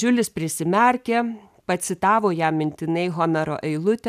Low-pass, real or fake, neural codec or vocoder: 14.4 kHz; real; none